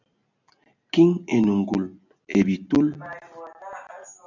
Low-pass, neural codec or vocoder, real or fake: 7.2 kHz; none; real